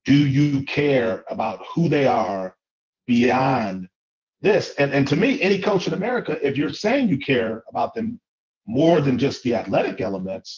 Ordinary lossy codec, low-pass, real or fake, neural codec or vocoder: Opus, 32 kbps; 7.2 kHz; fake; vocoder, 24 kHz, 100 mel bands, Vocos